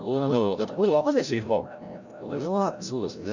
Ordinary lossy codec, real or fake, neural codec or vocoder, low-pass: none; fake; codec, 16 kHz, 0.5 kbps, FreqCodec, larger model; 7.2 kHz